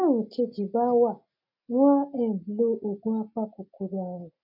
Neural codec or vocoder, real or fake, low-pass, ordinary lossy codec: none; real; 5.4 kHz; none